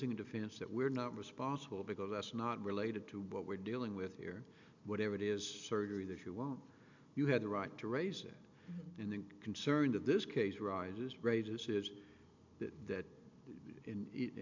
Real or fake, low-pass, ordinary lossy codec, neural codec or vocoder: real; 7.2 kHz; MP3, 64 kbps; none